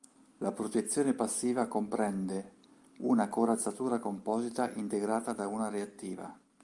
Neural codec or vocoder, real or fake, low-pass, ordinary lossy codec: none; real; 10.8 kHz; Opus, 32 kbps